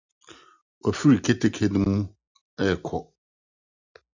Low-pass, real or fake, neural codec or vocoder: 7.2 kHz; real; none